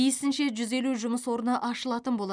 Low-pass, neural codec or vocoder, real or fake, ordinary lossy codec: 9.9 kHz; none; real; none